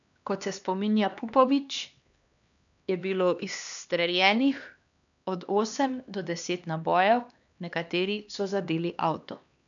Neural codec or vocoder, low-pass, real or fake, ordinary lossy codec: codec, 16 kHz, 2 kbps, X-Codec, HuBERT features, trained on LibriSpeech; 7.2 kHz; fake; none